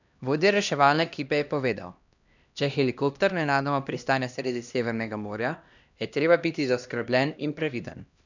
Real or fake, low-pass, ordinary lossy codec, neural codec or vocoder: fake; 7.2 kHz; none; codec, 16 kHz, 1 kbps, X-Codec, HuBERT features, trained on LibriSpeech